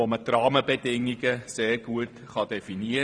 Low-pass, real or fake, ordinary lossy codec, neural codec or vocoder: 9.9 kHz; fake; none; vocoder, 44.1 kHz, 128 mel bands every 512 samples, BigVGAN v2